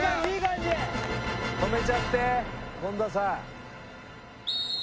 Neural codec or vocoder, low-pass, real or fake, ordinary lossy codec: none; none; real; none